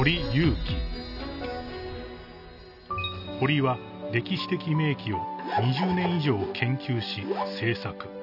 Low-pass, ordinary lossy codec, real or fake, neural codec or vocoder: 5.4 kHz; none; real; none